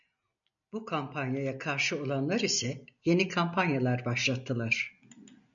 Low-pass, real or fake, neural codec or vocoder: 7.2 kHz; real; none